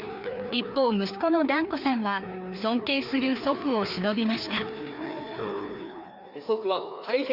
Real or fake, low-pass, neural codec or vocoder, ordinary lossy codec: fake; 5.4 kHz; codec, 16 kHz, 2 kbps, FreqCodec, larger model; none